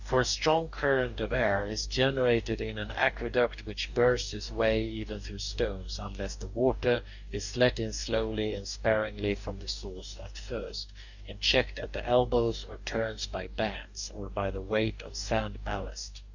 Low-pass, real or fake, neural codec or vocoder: 7.2 kHz; fake; codec, 44.1 kHz, 2.6 kbps, DAC